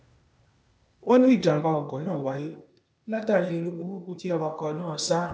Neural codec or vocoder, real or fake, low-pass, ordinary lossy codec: codec, 16 kHz, 0.8 kbps, ZipCodec; fake; none; none